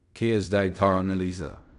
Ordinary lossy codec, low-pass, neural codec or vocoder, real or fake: none; 10.8 kHz; codec, 16 kHz in and 24 kHz out, 0.4 kbps, LongCat-Audio-Codec, fine tuned four codebook decoder; fake